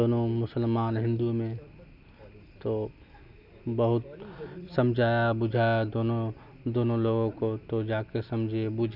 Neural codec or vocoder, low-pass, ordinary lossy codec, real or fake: none; 5.4 kHz; none; real